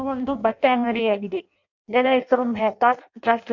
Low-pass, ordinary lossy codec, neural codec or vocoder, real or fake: 7.2 kHz; none; codec, 16 kHz in and 24 kHz out, 0.6 kbps, FireRedTTS-2 codec; fake